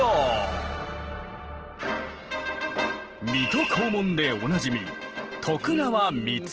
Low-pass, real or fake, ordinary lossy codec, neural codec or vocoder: 7.2 kHz; real; Opus, 16 kbps; none